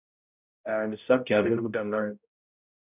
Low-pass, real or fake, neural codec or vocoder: 3.6 kHz; fake; codec, 16 kHz, 0.5 kbps, X-Codec, HuBERT features, trained on balanced general audio